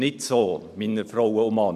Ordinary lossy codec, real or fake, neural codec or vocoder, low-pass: none; real; none; 14.4 kHz